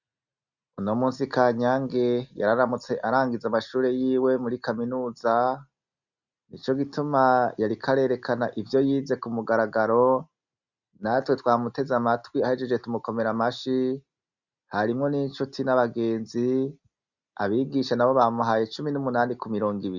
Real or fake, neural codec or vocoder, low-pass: real; none; 7.2 kHz